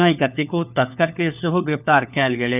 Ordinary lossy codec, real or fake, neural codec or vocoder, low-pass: none; fake; codec, 16 kHz, 4 kbps, FunCodec, trained on LibriTTS, 50 frames a second; 3.6 kHz